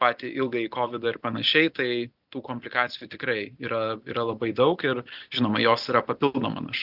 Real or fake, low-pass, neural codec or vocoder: real; 5.4 kHz; none